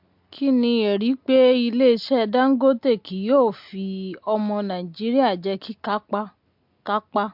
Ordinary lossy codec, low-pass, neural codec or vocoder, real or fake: MP3, 48 kbps; 5.4 kHz; none; real